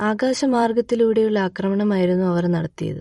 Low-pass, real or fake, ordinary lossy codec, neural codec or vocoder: 19.8 kHz; fake; MP3, 48 kbps; vocoder, 44.1 kHz, 128 mel bands every 256 samples, BigVGAN v2